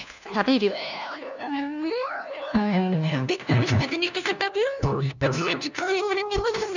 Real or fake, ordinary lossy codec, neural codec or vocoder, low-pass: fake; none; codec, 16 kHz, 1 kbps, FunCodec, trained on LibriTTS, 50 frames a second; 7.2 kHz